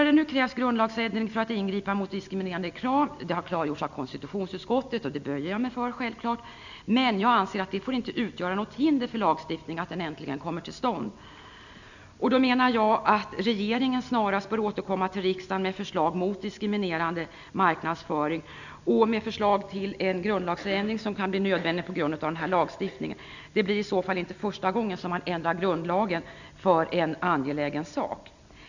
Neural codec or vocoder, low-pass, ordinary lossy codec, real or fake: none; 7.2 kHz; none; real